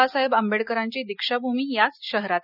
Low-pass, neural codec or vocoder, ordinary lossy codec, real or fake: 5.4 kHz; none; none; real